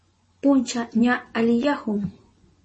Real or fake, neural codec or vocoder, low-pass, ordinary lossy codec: fake; vocoder, 24 kHz, 100 mel bands, Vocos; 10.8 kHz; MP3, 32 kbps